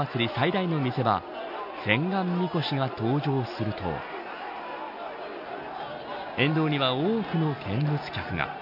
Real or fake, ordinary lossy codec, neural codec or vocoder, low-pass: real; none; none; 5.4 kHz